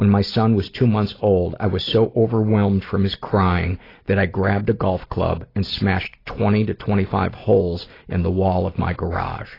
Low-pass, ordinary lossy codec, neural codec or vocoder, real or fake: 5.4 kHz; AAC, 24 kbps; none; real